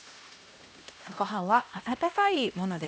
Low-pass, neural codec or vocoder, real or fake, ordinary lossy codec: none; codec, 16 kHz, 1 kbps, X-Codec, HuBERT features, trained on LibriSpeech; fake; none